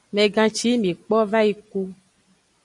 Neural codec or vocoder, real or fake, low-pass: none; real; 10.8 kHz